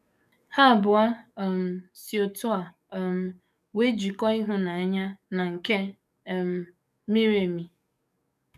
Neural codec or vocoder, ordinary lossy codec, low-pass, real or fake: codec, 44.1 kHz, 7.8 kbps, DAC; none; 14.4 kHz; fake